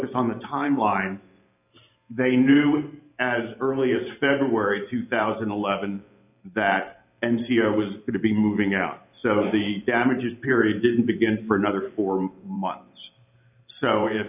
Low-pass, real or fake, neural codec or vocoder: 3.6 kHz; fake; vocoder, 44.1 kHz, 128 mel bands every 512 samples, BigVGAN v2